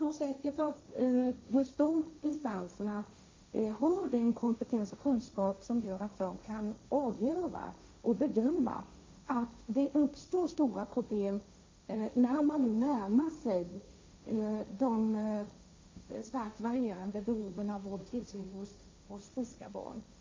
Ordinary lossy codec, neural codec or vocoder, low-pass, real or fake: MP3, 48 kbps; codec, 16 kHz, 1.1 kbps, Voila-Tokenizer; 7.2 kHz; fake